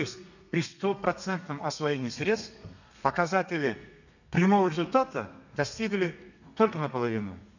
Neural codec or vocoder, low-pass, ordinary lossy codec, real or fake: codec, 32 kHz, 1.9 kbps, SNAC; 7.2 kHz; none; fake